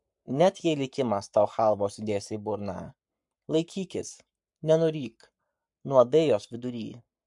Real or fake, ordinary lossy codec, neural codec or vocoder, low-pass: fake; MP3, 64 kbps; codec, 44.1 kHz, 7.8 kbps, Pupu-Codec; 10.8 kHz